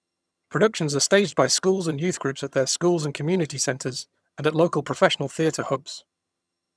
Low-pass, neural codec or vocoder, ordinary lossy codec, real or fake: none; vocoder, 22.05 kHz, 80 mel bands, HiFi-GAN; none; fake